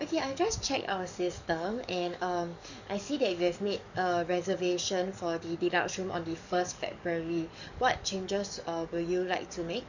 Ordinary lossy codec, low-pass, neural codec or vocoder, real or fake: none; 7.2 kHz; codec, 44.1 kHz, 7.8 kbps, DAC; fake